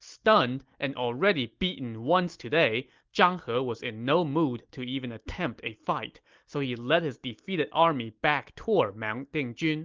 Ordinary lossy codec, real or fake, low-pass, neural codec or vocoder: Opus, 32 kbps; real; 7.2 kHz; none